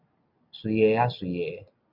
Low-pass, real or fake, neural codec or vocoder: 5.4 kHz; real; none